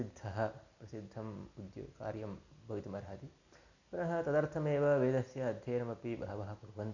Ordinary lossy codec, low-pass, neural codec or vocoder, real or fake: none; 7.2 kHz; none; real